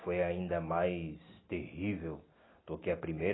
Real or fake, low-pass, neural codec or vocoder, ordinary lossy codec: real; 7.2 kHz; none; AAC, 16 kbps